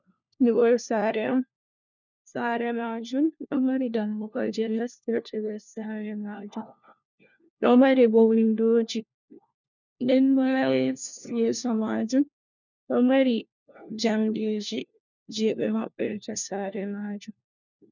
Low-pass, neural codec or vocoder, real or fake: 7.2 kHz; codec, 16 kHz, 1 kbps, FunCodec, trained on LibriTTS, 50 frames a second; fake